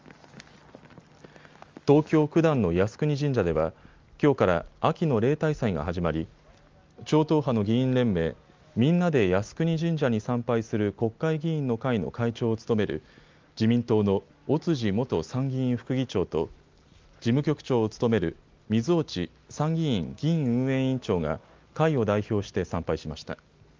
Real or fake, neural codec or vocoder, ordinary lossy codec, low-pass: real; none; Opus, 32 kbps; 7.2 kHz